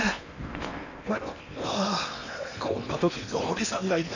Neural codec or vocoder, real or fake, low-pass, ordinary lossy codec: codec, 16 kHz in and 24 kHz out, 0.8 kbps, FocalCodec, streaming, 65536 codes; fake; 7.2 kHz; none